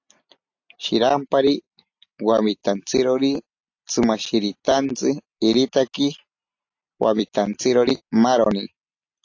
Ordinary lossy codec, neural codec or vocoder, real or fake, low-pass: AAC, 48 kbps; none; real; 7.2 kHz